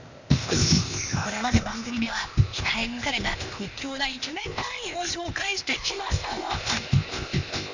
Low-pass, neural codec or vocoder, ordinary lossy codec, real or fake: 7.2 kHz; codec, 16 kHz, 0.8 kbps, ZipCodec; none; fake